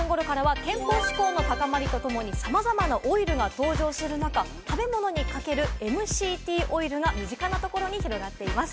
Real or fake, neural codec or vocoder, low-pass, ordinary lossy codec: real; none; none; none